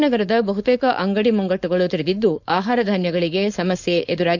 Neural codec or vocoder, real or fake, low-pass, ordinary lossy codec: codec, 16 kHz, 4.8 kbps, FACodec; fake; 7.2 kHz; none